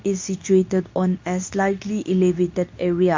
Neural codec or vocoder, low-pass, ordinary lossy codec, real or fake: codec, 24 kHz, 0.9 kbps, WavTokenizer, medium speech release version 2; 7.2 kHz; MP3, 48 kbps; fake